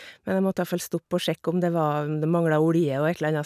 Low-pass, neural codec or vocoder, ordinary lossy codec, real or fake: 14.4 kHz; none; none; real